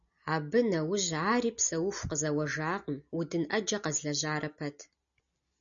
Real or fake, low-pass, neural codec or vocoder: real; 7.2 kHz; none